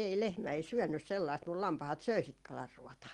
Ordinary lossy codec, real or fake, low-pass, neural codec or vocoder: Opus, 24 kbps; real; 10.8 kHz; none